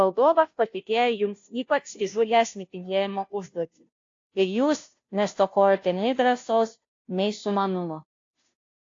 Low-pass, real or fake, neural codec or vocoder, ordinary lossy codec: 7.2 kHz; fake; codec, 16 kHz, 0.5 kbps, FunCodec, trained on Chinese and English, 25 frames a second; AAC, 48 kbps